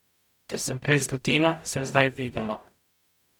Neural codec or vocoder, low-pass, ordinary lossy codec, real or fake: codec, 44.1 kHz, 0.9 kbps, DAC; 19.8 kHz; none; fake